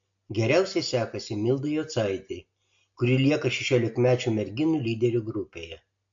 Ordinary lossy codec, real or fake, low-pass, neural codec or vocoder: MP3, 48 kbps; real; 7.2 kHz; none